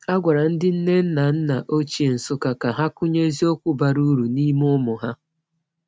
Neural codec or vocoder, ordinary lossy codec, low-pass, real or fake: none; none; none; real